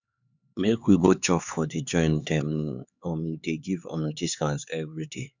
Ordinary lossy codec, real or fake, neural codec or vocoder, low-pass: none; fake; codec, 16 kHz, 4 kbps, X-Codec, HuBERT features, trained on LibriSpeech; 7.2 kHz